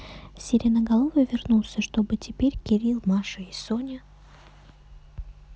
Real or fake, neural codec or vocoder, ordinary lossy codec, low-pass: real; none; none; none